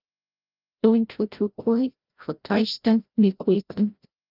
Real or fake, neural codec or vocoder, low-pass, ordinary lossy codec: fake; codec, 16 kHz, 0.5 kbps, FreqCodec, larger model; 5.4 kHz; Opus, 24 kbps